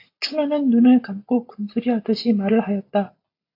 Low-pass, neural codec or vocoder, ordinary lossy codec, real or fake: 5.4 kHz; none; AAC, 32 kbps; real